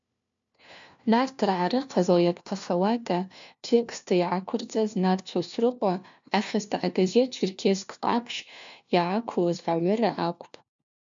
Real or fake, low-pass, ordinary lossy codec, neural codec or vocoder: fake; 7.2 kHz; MP3, 64 kbps; codec, 16 kHz, 1 kbps, FunCodec, trained on LibriTTS, 50 frames a second